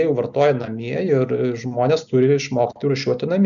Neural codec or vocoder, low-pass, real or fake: none; 7.2 kHz; real